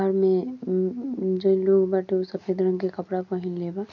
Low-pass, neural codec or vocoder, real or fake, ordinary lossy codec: 7.2 kHz; none; real; none